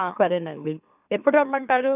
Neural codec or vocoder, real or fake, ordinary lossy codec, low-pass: autoencoder, 44.1 kHz, a latent of 192 numbers a frame, MeloTTS; fake; none; 3.6 kHz